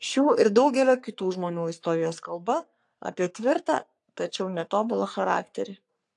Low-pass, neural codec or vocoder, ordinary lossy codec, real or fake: 10.8 kHz; codec, 44.1 kHz, 3.4 kbps, Pupu-Codec; AAC, 64 kbps; fake